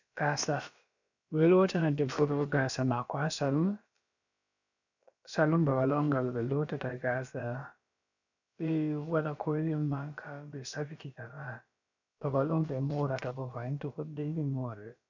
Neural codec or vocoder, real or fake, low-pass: codec, 16 kHz, about 1 kbps, DyCAST, with the encoder's durations; fake; 7.2 kHz